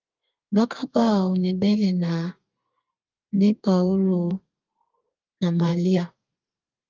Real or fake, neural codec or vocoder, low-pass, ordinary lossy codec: fake; codec, 32 kHz, 1.9 kbps, SNAC; 7.2 kHz; Opus, 24 kbps